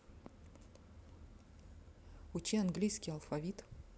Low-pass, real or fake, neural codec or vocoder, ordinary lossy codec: none; real; none; none